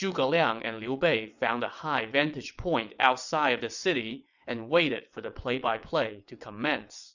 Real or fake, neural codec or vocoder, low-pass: fake; vocoder, 22.05 kHz, 80 mel bands, WaveNeXt; 7.2 kHz